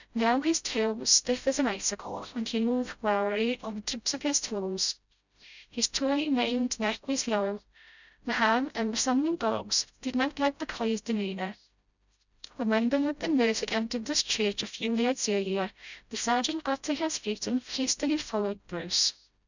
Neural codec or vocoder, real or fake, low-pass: codec, 16 kHz, 0.5 kbps, FreqCodec, smaller model; fake; 7.2 kHz